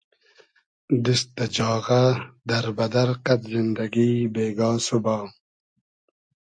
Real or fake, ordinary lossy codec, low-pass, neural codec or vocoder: real; MP3, 48 kbps; 9.9 kHz; none